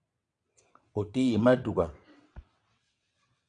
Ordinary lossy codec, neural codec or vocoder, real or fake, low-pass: MP3, 96 kbps; vocoder, 22.05 kHz, 80 mel bands, WaveNeXt; fake; 9.9 kHz